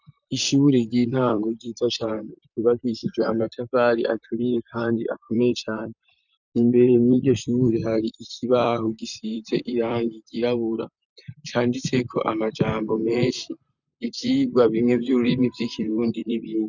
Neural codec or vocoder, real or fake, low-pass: vocoder, 44.1 kHz, 128 mel bands, Pupu-Vocoder; fake; 7.2 kHz